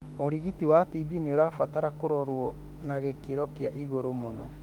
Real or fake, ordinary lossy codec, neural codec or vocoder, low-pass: fake; Opus, 32 kbps; autoencoder, 48 kHz, 32 numbers a frame, DAC-VAE, trained on Japanese speech; 19.8 kHz